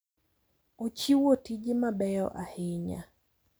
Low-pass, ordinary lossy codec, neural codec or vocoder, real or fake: none; none; none; real